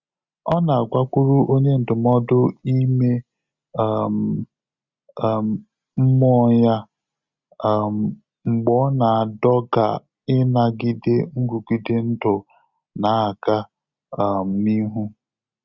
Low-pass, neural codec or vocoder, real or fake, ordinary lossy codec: 7.2 kHz; none; real; Opus, 64 kbps